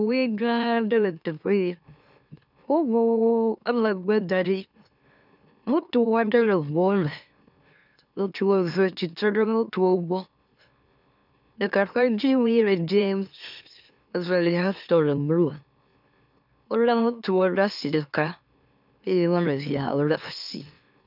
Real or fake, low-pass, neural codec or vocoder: fake; 5.4 kHz; autoencoder, 44.1 kHz, a latent of 192 numbers a frame, MeloTTS